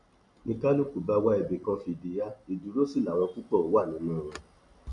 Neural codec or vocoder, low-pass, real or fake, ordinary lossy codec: none; 10.8 kHz; real; none